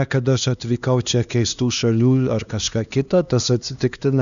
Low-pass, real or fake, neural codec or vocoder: 7.2 kHz; fake; codec, 16 kHz, 2 kbps, X-Codec, HuBERT features, trained on LibriSpeech